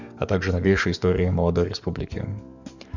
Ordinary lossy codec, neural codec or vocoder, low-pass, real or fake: none; codec, 44.1 kHz, 7.8 kbps, DAC; 7.2 kHz; fake